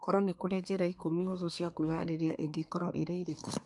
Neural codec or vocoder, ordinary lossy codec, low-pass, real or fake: codec, 24 kHz, 1 kbps, SNAC; none; 10.8 kHz; fake